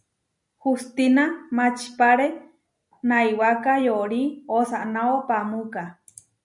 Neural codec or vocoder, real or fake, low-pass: none; real; 10.8 kHz